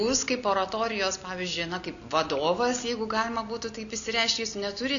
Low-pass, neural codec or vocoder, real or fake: 7.2 kHz; none; real